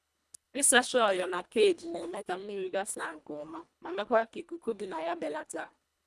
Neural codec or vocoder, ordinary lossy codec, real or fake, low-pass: codec, 24 kHz, 1.5 kbps, HILCodec; none; fake; none